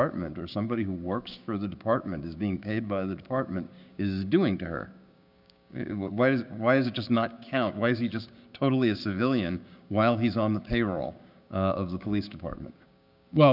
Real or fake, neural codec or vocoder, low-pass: fake; codec, 16 kHz, 6 kbps, DAC; 5.4 kHz